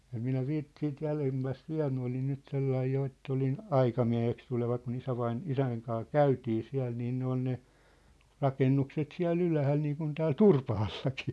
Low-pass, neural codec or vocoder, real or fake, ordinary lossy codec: none; none; real; none